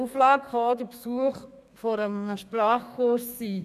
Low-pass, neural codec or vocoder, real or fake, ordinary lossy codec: 14.4 kHz; codec, 32 kHz, 1.9 kbps, SNAC; fake; none